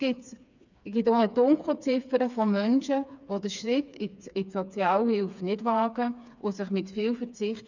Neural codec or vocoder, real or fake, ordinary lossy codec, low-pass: codec, 16 kHz, 4 kbps, FreqCodec, smaller model; fake; none; 7.2 kHz